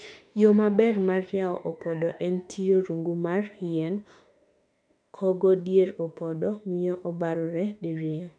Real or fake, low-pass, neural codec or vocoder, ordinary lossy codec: fake; 9.9 kHz; autoencoder, 48 kHz, 32 numbers a frame, DAC-VAE, trained on Japanese speech; none